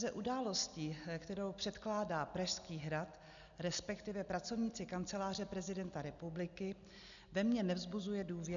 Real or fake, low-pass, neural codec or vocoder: real; 7.2 kHz; none